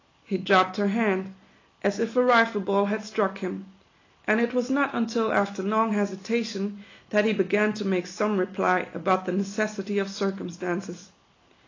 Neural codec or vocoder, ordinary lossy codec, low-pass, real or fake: none; AAC, 32 kbps; 7.2 kHz; real